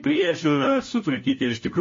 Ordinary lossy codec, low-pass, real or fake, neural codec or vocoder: MP3, 32 kbps; 7.2 kHz; fake; codec, 16 kHz, 1 kbps, FunCodec, trained on LibriTTS, 50 frames a second